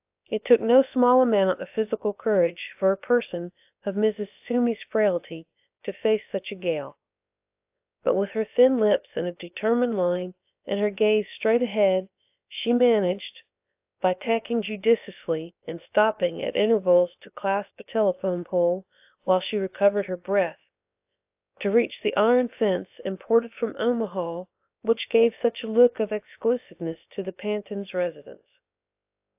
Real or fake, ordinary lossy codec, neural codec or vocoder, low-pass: fake; AAC, 32 kbps; codec, 16 kHz, about 1 kbps, DyCAST, with the encoder's durations; 3.6 kHz